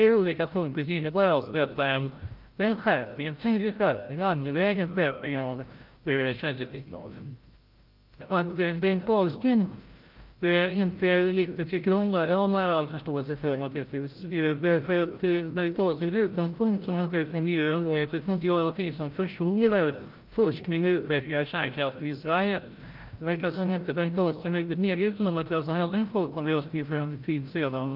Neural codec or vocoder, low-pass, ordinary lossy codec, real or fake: codec, 16 kHz, 0.5 kbps, FreqCodec, larger model; 5.4 kHz; Opus, 32 kbps; fake